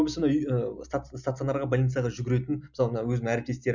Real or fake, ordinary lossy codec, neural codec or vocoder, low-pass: real; none; none; 7.2 kHz